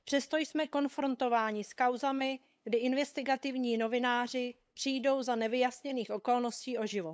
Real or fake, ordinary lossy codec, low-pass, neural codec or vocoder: fake; none; none; codec, 16 kHz, 8 kbps, FunCodec, trained on LibriTTS, 25 frames a second